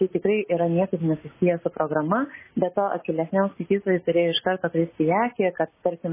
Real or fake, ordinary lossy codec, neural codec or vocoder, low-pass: real; MP3, 16 kbps; none; 3.6 kHz